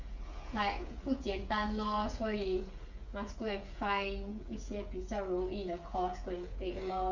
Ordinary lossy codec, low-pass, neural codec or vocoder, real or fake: none; 7.2 kHz; codec, 16 kHz, 8 kbps, FreqCodec, smaller model; fake